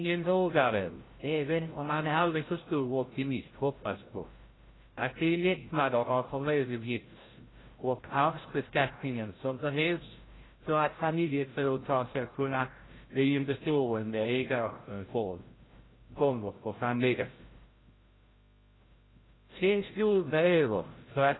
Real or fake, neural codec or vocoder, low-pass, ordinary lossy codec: fake; codec, 16 kHz, 0.5 kbps, FreqCodec, larger model; 7.2 kHz; AAC, 16 kbps